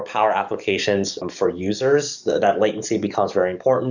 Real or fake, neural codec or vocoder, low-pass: fake; codec, 44.1 kHz, 7.8 kbps, DAC; 7.2 kHz